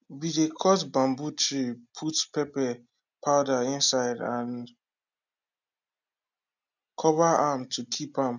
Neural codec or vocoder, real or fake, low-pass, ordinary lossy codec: none; real; 7.2 kHz; none